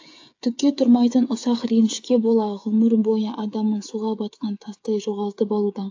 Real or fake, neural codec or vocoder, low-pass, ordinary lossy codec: fake; codec, 16 kHz, 8 kbps, FreqCodec, smaller model; 7.2 kHz; AAC, 48 kbps